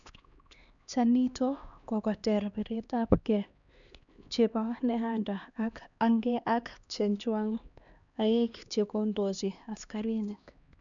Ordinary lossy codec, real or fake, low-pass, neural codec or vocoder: none; fake; 7.2 kHz; codec, 16 kHz, 2 kbps, X-Codec, HuBERT features, trained on LibriSpeech